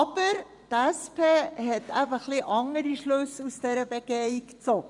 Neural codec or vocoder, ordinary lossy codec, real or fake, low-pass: vocoder, 24 kHz, 100 mel bands, Vocos; none; fake; 10.8 kHz